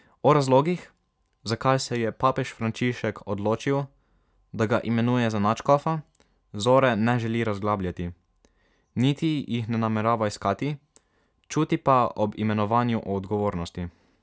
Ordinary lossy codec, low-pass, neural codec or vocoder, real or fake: none; none; none; real